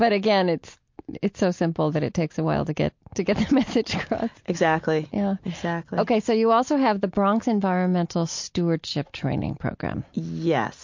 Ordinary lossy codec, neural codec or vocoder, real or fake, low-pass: MP3, 48 kbps; none; real; 7.2 kHz